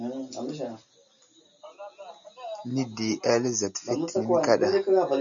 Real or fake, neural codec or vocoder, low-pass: real; none; 7.2 kHz